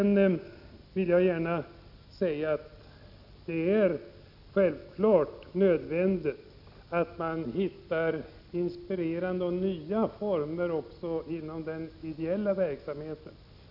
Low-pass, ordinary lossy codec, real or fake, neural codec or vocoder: 5.4 kHz; none; real; none